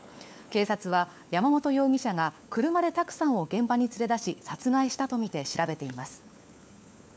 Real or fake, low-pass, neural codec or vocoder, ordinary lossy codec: fake; none; codec, 16 kHz, 4 kbps, FunCodec, trained on LibriTTS, 50 frames a second; none